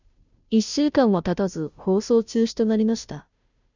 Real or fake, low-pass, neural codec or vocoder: fake; 7.2 kHz; codec, 16 kHz, 0.5 kbps, FunCodec, trained on Chinese and English, 25 frames a second